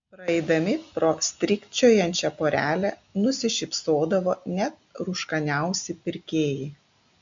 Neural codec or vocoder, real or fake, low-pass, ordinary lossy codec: none; real; 7.2 kHz; MP3, 64 kbps